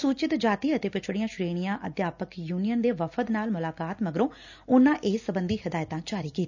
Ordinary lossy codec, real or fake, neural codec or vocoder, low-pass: none; real; none; 7.2 kHz